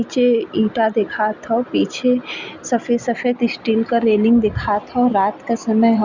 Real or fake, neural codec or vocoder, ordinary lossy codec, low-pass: real; none; Opus, 64 kbps; 7.2 kHz